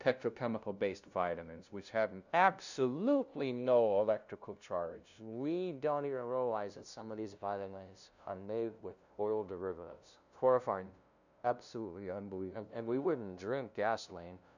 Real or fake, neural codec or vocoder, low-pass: fake; codec, 16 kHz, 0.5 kbps, FunCodec, trained on LibriTTS, 25 frames a second; 7.2 kHz